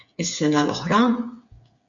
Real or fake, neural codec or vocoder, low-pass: fake; codec, 16 kHz, 8 kbps, FreqCodec, smaller model; 7.2 kHz